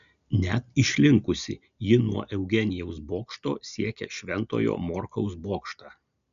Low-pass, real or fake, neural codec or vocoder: 7.2 kHz; real; none